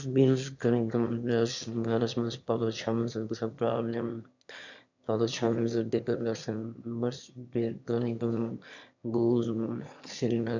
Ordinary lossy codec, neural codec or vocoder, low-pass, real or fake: none; autoencoder, 22.05 kHz, a latent of 192 numbers a frame, VITS, trained on one speaker; 7.2 kHz; fake